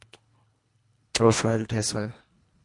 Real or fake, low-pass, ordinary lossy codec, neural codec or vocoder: fake; 10.8 kHz; MP3, 64 kbps; codec, 24 kHz, 1.5 kbps, HILCodec